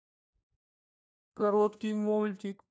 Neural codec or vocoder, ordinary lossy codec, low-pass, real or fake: codec, 16 kHz, 1 kbps, FunCodec, trained on LibriTTS, 50 frames a second; none; none; fake